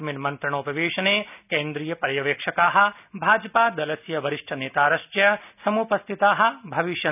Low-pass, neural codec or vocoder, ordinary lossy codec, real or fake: 3.6 kHz; none; none; real